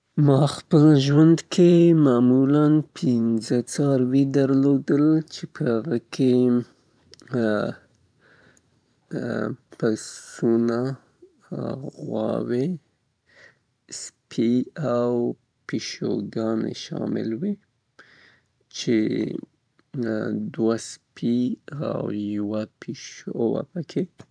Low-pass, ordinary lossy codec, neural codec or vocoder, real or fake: 9.9 kHz; none; vocoder, 44.1 kHz, 128 mel bands every 512 samples, BigVGAN v2; fake